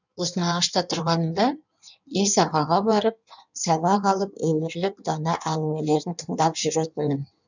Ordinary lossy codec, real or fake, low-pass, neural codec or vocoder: none; fake; 7.2 kHz; codec, 16 kHz in and 24 kHz out, 1.1 kbps, FireRedTTS-2 codec